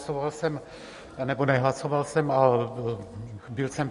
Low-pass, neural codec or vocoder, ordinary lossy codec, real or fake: 14.4 kHz; none; MP3, 48 kbps; real